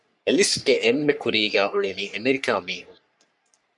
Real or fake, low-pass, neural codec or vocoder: fake; 10.8 kHz; codec, 44.1 kHz, 3.4 kbps, Pupu-Codec